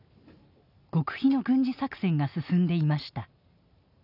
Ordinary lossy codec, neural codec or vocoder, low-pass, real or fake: none; none; 5.4 kHz; real